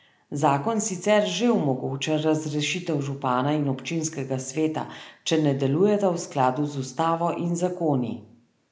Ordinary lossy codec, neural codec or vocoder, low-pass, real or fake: none; none; none; real